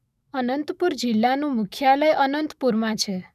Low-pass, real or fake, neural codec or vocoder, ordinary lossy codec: 14.4 kHz; fake; autoencoder, 48 kHz, 128 numbers a frame, DAC-VAE, trained on Japanese speech; none